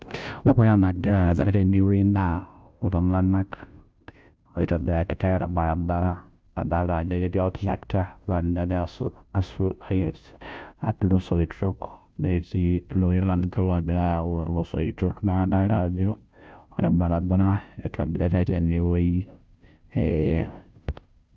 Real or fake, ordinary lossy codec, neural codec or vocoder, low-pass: fake; none; codec, 16 kHz, 0.5 kbps, FunCodec, trained on Chinese and English, 25 frames a second; none